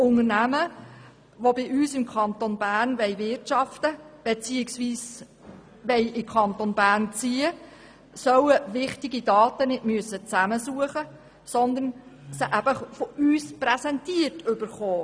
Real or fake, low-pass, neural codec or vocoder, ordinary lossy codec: real; none; none; none